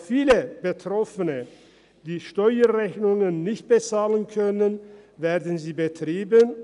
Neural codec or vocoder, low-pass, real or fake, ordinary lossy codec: none; 10.8 kHz; real; none